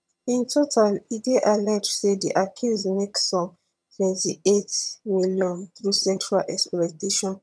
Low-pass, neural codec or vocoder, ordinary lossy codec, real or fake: none; vocoder, 22.05 kHz, 80 mel bands, HiFi-GAN; none; fake